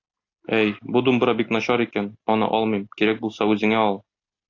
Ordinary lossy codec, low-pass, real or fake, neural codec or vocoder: AAC, 48 kbps; 7.2 kHz; real; none